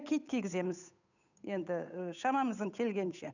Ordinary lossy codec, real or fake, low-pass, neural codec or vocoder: none; fake; 7.2 kHz; codec, 16 kHz, 8 kbps, FunCodec, trained on Chinese and English, 25 frames a second